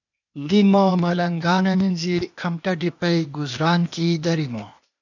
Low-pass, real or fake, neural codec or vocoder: 7.2 kHz; fake; codec, 16 kHz, 0.8 kbps, ZipCodec